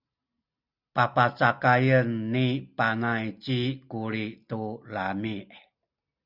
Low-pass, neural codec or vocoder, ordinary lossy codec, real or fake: 5.4 kHz; none; Opus, 64 kbps; real